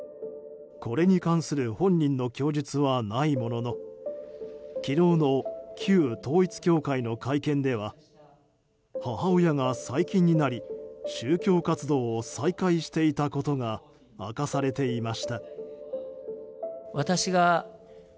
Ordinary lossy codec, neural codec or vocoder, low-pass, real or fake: none; none; none; real